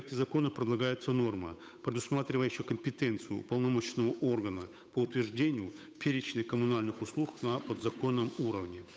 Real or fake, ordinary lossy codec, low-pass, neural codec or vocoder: fake; none; none; codec, 16 kHz, 8 kbps, FunCodec, trained on Chinese and English, 25 frames a second